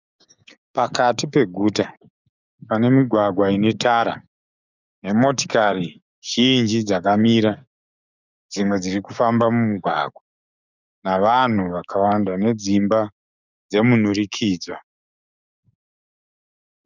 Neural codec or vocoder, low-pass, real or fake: codec, 16 kHz, 6 kbps, DAC; 7.2 kHz; fake